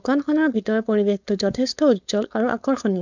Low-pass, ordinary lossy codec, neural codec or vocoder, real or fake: 7.2 kHz; none; codec, 16 kHz, 4.8 kbps, FACodec; fake